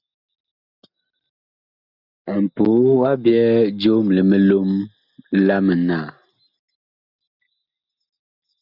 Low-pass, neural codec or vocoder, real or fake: 5.4 kHz; none; real